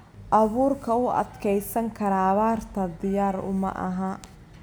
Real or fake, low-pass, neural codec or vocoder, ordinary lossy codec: real; none; none; none